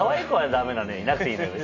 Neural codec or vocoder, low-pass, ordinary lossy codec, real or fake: none; 7.2 kHz; none; real